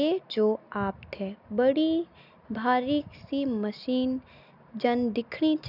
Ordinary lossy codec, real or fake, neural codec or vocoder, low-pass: none; real; none; 5.4 kHz